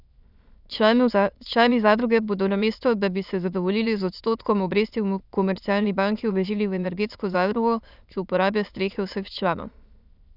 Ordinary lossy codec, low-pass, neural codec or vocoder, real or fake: none; 5.4 kHz; autoencoder, 22.05 kHz, a latent of 192 numbers a frame, VITS, trained on many speakers; fake